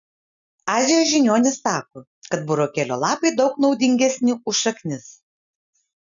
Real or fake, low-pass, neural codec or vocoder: real; 7.2 kHz; none